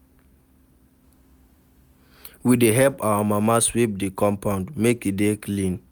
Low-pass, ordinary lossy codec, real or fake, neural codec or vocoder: none; none; real; none